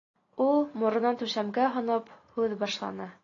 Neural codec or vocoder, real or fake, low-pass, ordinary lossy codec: none; real; 7.2 kHz; AAC, 32 kbps